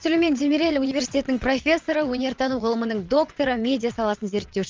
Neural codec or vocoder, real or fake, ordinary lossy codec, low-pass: vocoder, 22.05 kHz, 80 mel bands, WaveNeXt; fake; Opus, 24 kbps; 7.2 kHz